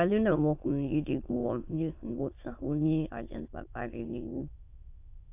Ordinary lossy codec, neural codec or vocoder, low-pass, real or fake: AAC, 32 kbps; autoencoder, 22.05 kHz, a latent of 192 numbers a frame, VITS, trained on many speakers; 3.6 kHz; fake